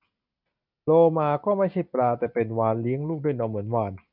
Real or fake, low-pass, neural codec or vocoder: real; 5.4 kHz; none